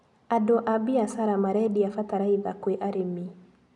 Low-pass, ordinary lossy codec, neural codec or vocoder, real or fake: 10.8 kHz; none; none; real